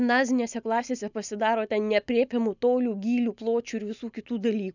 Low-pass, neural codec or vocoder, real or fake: 7.2 kHz; none; real